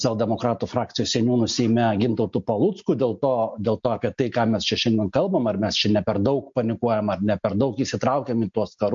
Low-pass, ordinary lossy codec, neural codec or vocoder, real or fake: 7.2 kHz; MP3, 48 kbps; none; real